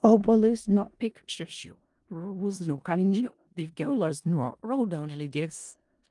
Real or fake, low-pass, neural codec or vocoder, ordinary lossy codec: fake; 10.8 kHz; codec, 16 kHz in and 24 kHz out, 0.4 kbps, LongCat-Audio-Codec, four codebook decoder; Opus, 32 kbps